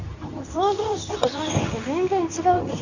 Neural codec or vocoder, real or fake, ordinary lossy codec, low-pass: codec, 24 kHz, 0.9 kbps, WavTokenizer, medium speech release version 2; fake; none; 7.2 kHz